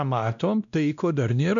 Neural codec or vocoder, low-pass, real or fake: codec, 16 kHz, 1 kbps, X-Codec, WavLM features, trained on Multilingual LibriSpeech; 7.2 kHz; fake